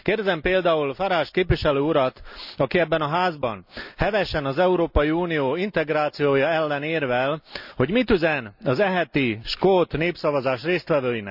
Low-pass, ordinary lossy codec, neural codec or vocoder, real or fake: 5.4 kHz; none; none; real